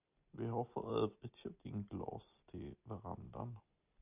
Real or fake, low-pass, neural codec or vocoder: real; 3.6 kHz; none